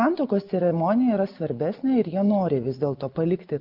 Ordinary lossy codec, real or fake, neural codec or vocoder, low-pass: Opus, 24 kbps; real; none; 5.4 kHz